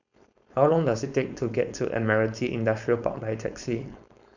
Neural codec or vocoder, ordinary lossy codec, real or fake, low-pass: codec, 16 kHz, 4.8 kbps, FACodec; none; fake; 7.2 kHz